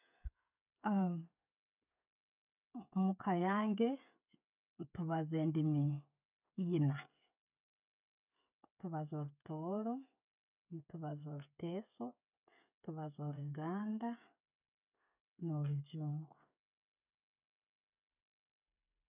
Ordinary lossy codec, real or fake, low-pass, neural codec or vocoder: none; fake; 3.6 kHz; codec, 16 kHz, 16 kbps, FreqCodec, smaller model